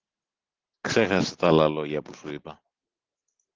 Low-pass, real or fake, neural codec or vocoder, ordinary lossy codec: 7.2 kHz; real; none; Opus, 16 kbps